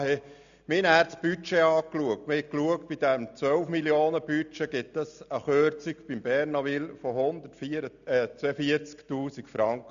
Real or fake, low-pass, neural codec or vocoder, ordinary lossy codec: real; 7.2 kHz; none; none